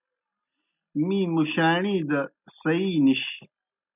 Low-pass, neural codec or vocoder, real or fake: 3.6 kHz; none; real